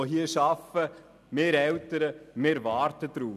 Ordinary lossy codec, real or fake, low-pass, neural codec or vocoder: none; real; 14.4 kHz; none